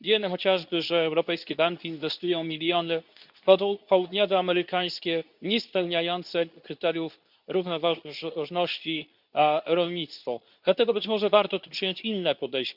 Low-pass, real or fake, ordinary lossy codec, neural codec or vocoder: 5.4 kHz; fake; none; codec, 24 kHz, 0.9 kbps, WavTokenizer, medium speech release version 2